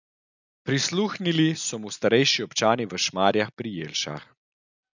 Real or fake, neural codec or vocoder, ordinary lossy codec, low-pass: real; none; none; 7.2 kHz